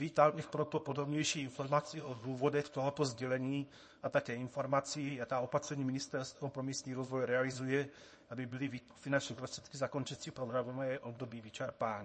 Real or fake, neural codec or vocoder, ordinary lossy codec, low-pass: fake; codec, 24 kHz, 0.9 kbps, WavTokenizer, small release; MP3, 32 kbps; 10.8 kHz